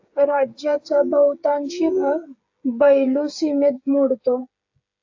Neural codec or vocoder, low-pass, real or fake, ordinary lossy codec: codec, 16 kHz, 16 kbps, FreqCodec, smaller model; 7.2 kHz; fake; AAC, 48 kbps